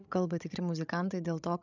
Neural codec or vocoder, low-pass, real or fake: codec, 16 kHz, 16 kbps, FreqCodec, larger model; 7.2 kHz; fake